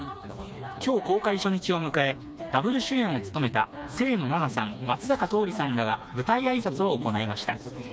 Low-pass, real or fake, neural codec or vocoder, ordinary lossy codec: none; fake; codec, 16 kHz, 2 kbps, FreqCodec, smaller model; none